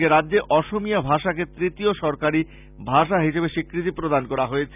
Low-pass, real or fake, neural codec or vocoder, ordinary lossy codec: 3.6 kHz; real; none; none